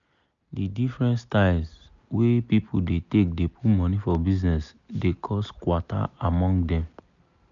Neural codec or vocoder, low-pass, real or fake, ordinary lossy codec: none; 7.2 kHz; real; none